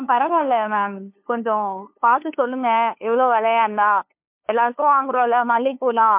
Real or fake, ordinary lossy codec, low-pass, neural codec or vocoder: fake; MP3, 32 kbps; 3.6 kHz; codec, 16 kHz, 2 kbps, FunCodec, trained on LibriTTS, 25 frames a second